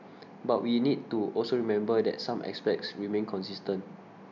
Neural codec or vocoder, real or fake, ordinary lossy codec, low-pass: none; real; none; 7.2 kHz